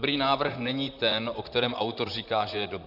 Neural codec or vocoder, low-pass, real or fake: vocoder, 44.1 kHz, 128 mel bands, Pupu-Vocoder; 5.4 kHz; fake